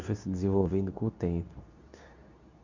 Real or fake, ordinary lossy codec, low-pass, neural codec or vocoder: fake; none; 7.2 kHz; codec, 24 kHz, 0.9 kbps, WavTokenizer, medium speech release version 2